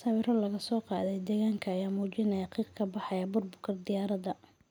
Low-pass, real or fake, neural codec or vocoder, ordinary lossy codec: 19.8 kHz; real; none; none